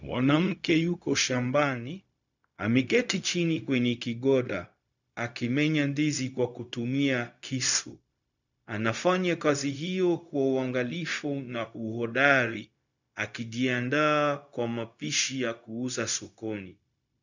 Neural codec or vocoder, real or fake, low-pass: codec, 16 kHz, 0.4 kbps, LongCat-Audio-Codec; fake; 7.2 kHz